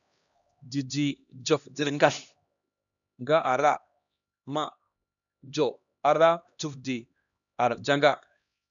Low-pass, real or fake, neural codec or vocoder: 7.2 kHz; fake; codec, 16 kHz, 1 kbps, X-Codec, HuBERT features, trained on LibriSpeech